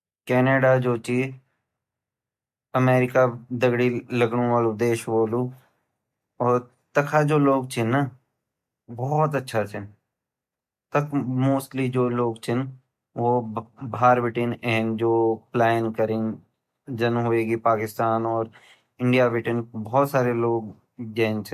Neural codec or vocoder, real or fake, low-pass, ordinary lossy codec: none; real; 14.4 kHz; MP3, 64 kbps